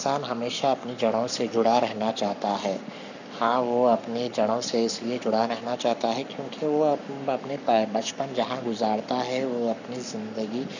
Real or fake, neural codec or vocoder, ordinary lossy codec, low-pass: fake; codec, 44.1 kHz, 7.8 kbps, Pupu-Codec; none; 7.2 kHz